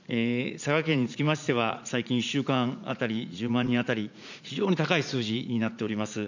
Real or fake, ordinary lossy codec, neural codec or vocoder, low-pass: fake; none; vocoder, 44.1 kHz, 80 mel bands, Vocos; 7.2 kHz